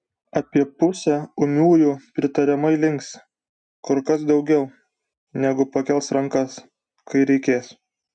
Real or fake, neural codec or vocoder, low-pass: real; none; 9.9 kHz